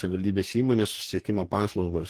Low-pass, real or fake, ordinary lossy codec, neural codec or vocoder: 14.4 kHz; fake; Opus, 16 kbps; codec, 44.1 kHz, 2.6 kbps, DAC